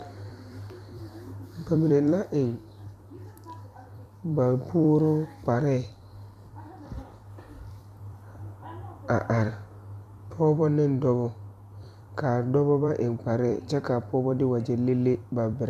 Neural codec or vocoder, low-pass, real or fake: vocoder, 44.1 kHz, 128 mel bands every 256 samples, BigVGAN v2; 14.4 kHz; fake